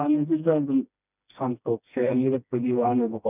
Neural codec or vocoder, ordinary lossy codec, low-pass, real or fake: codec, 16 kHz, 1 kbps, FreqCodec, smaller model; none; 3.6 kHz; fake